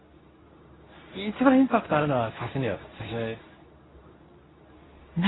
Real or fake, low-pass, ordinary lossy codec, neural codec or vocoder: fake; 7.2 kHz; AAC, 16 kbps; codec, 24 kHz, 0.9 kbps, WavTokenizer, medium speech release version 2